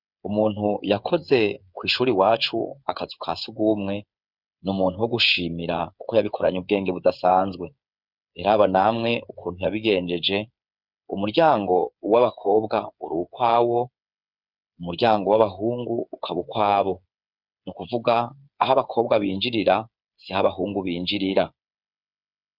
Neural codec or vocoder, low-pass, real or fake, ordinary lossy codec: codec, 16 kHz, 8 kbps, FreqCodec, smaller model; 5.4 kHz; fake; Opus, 64 kbps